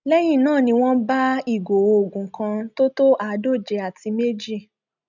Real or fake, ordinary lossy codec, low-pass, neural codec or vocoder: real; none; 7.2 kHz; none